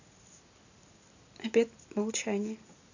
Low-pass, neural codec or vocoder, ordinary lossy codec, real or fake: 7.2 kHz; none; none; real